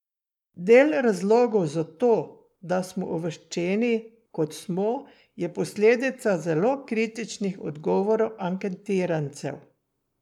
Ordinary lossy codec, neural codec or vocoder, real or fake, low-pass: none; codec, 44.1 kHz, 7.8 kbps, Pupu-Codec; fake; 19.8 kHz